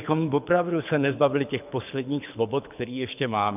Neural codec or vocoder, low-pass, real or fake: codec, 24 kHz, 6 kbps, HILCodec; 3.6 kHz; fake